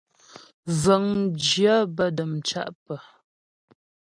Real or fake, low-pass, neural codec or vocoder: real; 9.9 kHz; none